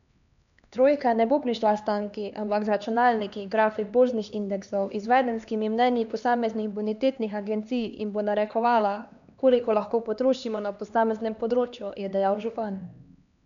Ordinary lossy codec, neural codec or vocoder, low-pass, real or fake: none; codec, 16 kHz, 2 kbps, X-Codec, HuBERT features, trained on LibriSpeech; 7.2 kHz; fake